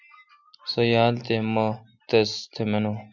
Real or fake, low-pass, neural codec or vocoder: real; 7.2 kHz; none